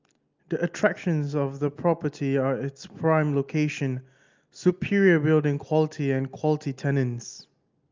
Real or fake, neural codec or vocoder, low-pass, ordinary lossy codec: real; none; 7.2 kHz; Opus, 24 kbps